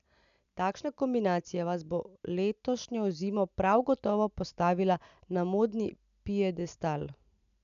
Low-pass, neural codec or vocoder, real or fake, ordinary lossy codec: 7.2 kHz; none; real; none